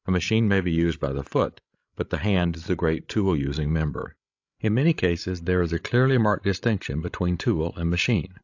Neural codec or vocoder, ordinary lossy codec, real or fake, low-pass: codec, 16 kHz, 16 kbps, FreqCodec, larger model; AAC, 48 kbps; fake; 7.2 kHz